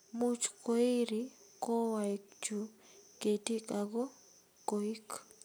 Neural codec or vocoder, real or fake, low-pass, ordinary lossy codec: none; real; none; none